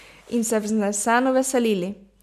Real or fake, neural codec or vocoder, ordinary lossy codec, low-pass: real; none; none; 14.4 kHz